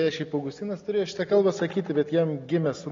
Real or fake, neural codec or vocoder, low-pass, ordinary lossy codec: real; none; 7.2 kHz; AAC, 32 kbps